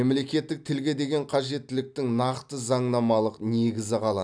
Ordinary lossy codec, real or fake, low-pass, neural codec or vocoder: none; real; 9.9 kHz; none